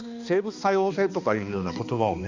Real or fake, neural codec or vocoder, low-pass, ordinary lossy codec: fake; codec, 16 kHz, 2 kbps, X-Codec, HuBERT features, trained on balanced general audio; 7.2 kHz; Opus, 64 kbps